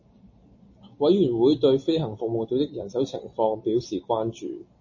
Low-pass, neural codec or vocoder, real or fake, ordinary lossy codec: 7.2 kHz; none; real; MP3, 32 kbps